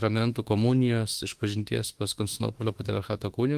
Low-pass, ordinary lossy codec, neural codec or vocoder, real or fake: 14.4 kHz; Opus, 24 kbps; autoencoder, 48 kHz, 32 numbers a frame, DAC-VAE, trained on Japanese speech; fake